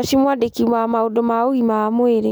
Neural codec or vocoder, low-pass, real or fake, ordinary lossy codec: none; none; real; none